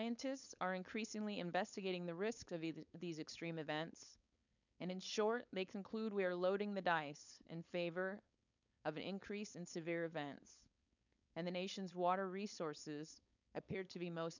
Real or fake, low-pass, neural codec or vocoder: fake; 7.2 kHz; codec, 16 kHz, 4.8 kbps, FACodec